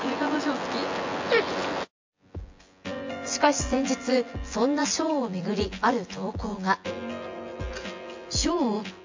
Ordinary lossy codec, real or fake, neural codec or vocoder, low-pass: MP3, 48 kbps; fake; vocoder, 24 kHz, 100 mel bands, Vocos; 7.2 kHz